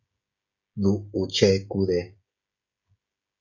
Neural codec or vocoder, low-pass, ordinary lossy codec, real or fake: codec, 16 kHz, 16 kbps, FreqCodec, smaller model; 7.2 kHz; MP3, 48 kbps; fake